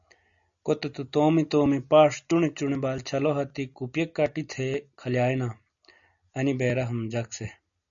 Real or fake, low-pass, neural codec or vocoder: real; 7.2 kHz; none